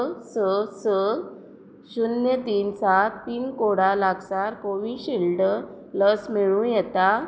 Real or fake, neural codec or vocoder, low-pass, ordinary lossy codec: real; none; none; none